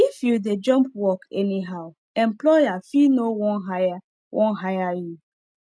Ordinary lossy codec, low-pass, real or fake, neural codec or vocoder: none; none; real; none